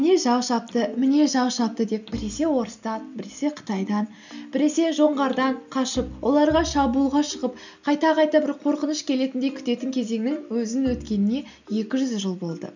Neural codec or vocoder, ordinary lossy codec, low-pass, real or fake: none; none; 7.2 kHz; real